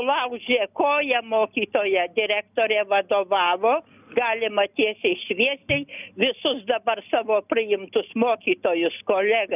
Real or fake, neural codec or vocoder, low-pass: real; none; 3.6 kHz